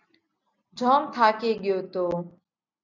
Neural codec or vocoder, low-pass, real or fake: none; 7.2 kHz; real